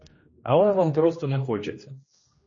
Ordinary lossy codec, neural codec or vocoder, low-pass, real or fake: MP3, 32 kbps; codec, 16 kHz, 1 kbps, X-Codec, HuBERT features, trained on general audio; 7.2 kHz; fake